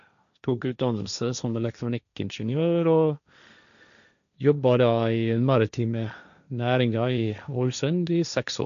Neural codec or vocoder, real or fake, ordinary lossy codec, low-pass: codec, 16 kHz, 1.1 kbps, Voila-Tokenizer; fake; none; 7.2 kHz